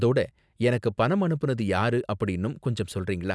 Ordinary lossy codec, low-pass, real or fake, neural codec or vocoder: none; none; real; none